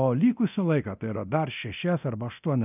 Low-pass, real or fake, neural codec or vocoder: 3.6 kHz; fake; codec, 24 kHz, 0.9 kbps, DualCodec